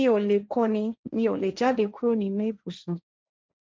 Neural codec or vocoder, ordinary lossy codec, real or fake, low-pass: codec, 16 kHz, 1.1 kbps, Voila-Tokenizer; none; fake; none